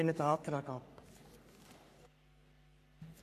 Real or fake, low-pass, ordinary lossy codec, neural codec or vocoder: fake; 14.4 kHz; none; codec, 44.1 kHz, 3.4 kbps, Pupu-Codec